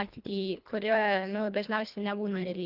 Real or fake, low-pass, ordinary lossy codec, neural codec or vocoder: fake; 5.4 kHz; Opus, 24 kbps; codec, 24 kHz, 1.5 kbps, HILCodec